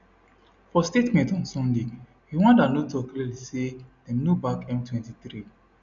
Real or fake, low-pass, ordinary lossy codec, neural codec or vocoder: real; 7.2 kHz; none; none